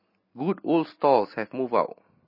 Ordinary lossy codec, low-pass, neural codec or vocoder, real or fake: MP3, 24 kbps; 5.4 kHz; none; real